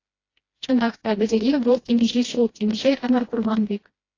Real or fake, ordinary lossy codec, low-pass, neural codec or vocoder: fake; AAC, 32 kbps; 7.2 kHz; codec, 16 kHz, 1 kbps, FreqCodec, smaller model